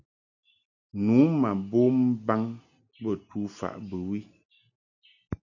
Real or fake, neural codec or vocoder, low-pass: real; none; 7.2 kHz